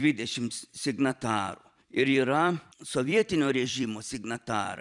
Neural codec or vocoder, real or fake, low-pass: vocoder, 44.1 kHz, 128 mel bands every 256 samples, BigVGAN v2; fake; 10.8 kHz